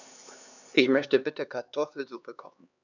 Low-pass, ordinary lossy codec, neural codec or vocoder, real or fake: 7.2 kHz; none; codec, 16 kHz, 2 kbps, X-Codec, HuBERT features, trained on LibriSpeech; fake